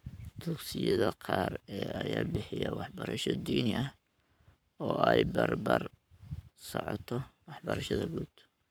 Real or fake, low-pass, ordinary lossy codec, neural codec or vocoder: fake; none; none; codec, 44.1 kHz, 7.8 kbps, Pupu-Codec